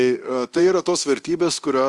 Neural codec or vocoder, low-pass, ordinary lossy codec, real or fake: codec, 24 kHz, 0.9 kbps, DualCodec; 10.8 kHz; Opus, 32 kbps; fake